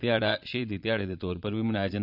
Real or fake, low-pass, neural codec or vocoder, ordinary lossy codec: fake; 5.4 kHz; vocoder, 22.05 kHz, 80 mel bands, Vocos; none